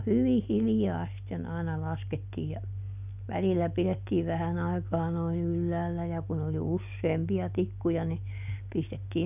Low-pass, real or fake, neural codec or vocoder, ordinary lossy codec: 3.6 kHz; real; none; none